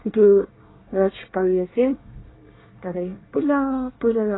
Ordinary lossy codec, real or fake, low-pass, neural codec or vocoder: AAC, 16 kbps; fake; 7.2 kHz; codec, 24 kHz, 1 kbps, SNAC